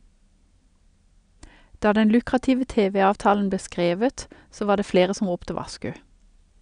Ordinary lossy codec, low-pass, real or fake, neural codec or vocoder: none; 9.9 kHz; real; none